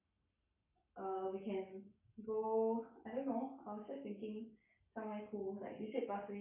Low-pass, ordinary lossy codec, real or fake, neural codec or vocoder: 3.6 kHz; none; fake; codec, 44.1 kHz, 7.8 kbps, Pupu-Codec